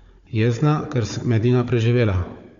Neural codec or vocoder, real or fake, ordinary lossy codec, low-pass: codec, 16 kHz, 4 kbps, FunCodec, trained on Chinese and English, 50 frames a second; fake; Opus, 64 kbps; 7.2 kHz